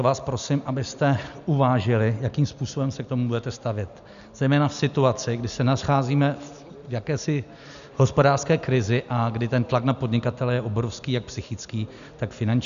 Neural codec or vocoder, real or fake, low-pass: none; real; 7.2 kHz